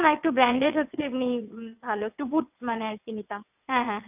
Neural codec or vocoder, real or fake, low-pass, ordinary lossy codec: vocoder, 22.05 kHz, 80 mel bands, WaveNeXt; fake; 3.6 kHz; none